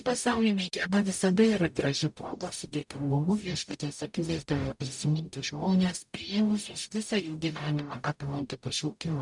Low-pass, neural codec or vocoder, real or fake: 10.8 kHz; codec, 44.1 kHz, 0.9 kbps, DAC; fake